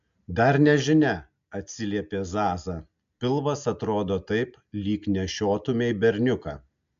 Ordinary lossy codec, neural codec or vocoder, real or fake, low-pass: AAC, 64 kbps; none; real; 7.2 kHz